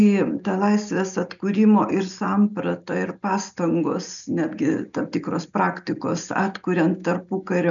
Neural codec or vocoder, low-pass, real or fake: none; 7.2 kHz; real